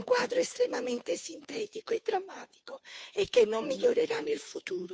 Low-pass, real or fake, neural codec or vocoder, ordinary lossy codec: none; fake; codec, 16 kHz, 2 kbps, FunCodec, trained on Chinese and English, 25 frames a second; none